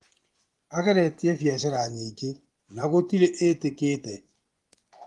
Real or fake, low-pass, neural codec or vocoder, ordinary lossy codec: fake; 10.8 kHz; vocoder, 24 kHz, 100 mel bands, Vocos; Opus, 24 kbps